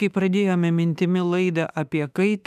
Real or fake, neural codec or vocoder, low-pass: fake; autoencoder, 48 kHz, 32 numbers a frame, DAC-VAE, trained on Japanese speech; 14.4 kHz